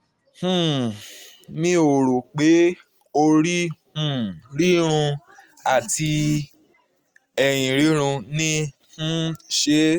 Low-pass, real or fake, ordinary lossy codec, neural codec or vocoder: 19.8 kHz; real; Opus, 32 kbps; none